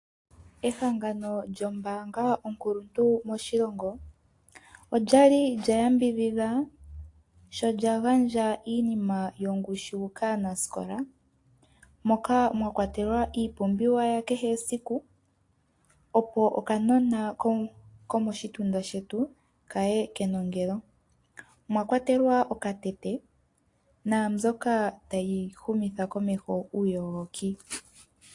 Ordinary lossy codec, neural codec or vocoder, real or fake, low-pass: AAC, 48 kbps; none; real; 10.8 kHz